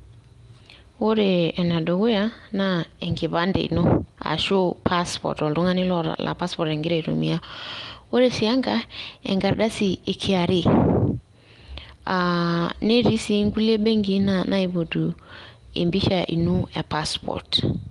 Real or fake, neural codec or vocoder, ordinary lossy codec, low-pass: real; none; Opus, 24 kbps; 10.8 kHz